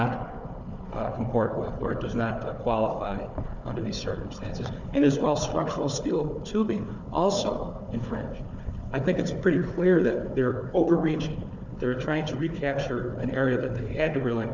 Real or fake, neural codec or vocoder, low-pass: fake; codec, 16 kHz, 4 kbps, FunCodec, trained on Chinese and English, 50 frames a second; 7.2 kHz